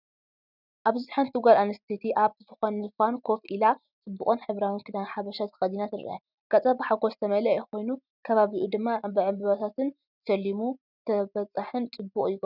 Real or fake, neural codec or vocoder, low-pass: real; none; 5.4 kHz